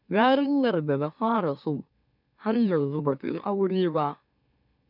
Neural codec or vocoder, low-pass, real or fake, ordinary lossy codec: autoencoder, 44.1 kHz, a latent of 192 numbers a frame, MeloTTS; 5.4 kHz; fake; none